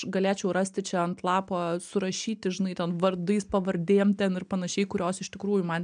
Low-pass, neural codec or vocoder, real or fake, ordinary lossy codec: 9.9 kHz; none; real; MP3, 96 kbps